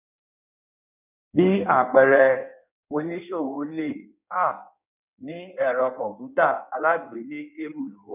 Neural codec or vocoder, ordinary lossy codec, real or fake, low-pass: codec, 16 kHz in and 24 kHz out, 1.1 kbps, FireRedTTS-2 codec; none; fake; 3.6 kHz